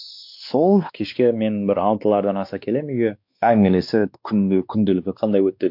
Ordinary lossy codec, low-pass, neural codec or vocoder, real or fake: MP3, 48 kbps; 5.4 kHz; codec, 16 kHz, 2 kbps, X-Codec, WavLM features, trained on Multilingual LibriSpeech; fake